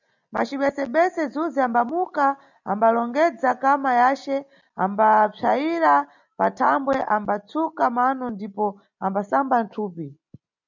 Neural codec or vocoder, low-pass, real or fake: none; 7.2 kHz; real